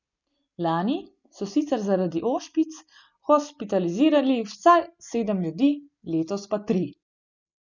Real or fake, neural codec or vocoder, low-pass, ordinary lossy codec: real; none; 7.2 kHz; none